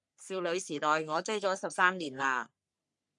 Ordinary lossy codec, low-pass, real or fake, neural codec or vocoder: MP3, 96 kbps; 10.8 kHz; fake; codec, 44.1 kHz, 3.4 kbps, Pupu-Codec